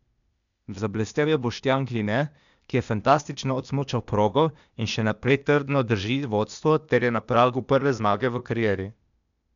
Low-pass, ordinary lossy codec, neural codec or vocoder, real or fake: 7.2 kHz; none; codec, 16 kHz, 0.8 kbps, ZipCodec; fake